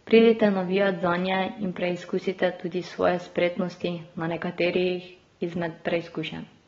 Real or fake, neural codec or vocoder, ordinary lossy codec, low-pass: real; none; AAC, 24 kbps; 7.2 kHz